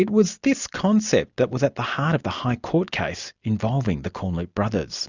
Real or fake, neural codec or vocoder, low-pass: real; none; 7.2 kHz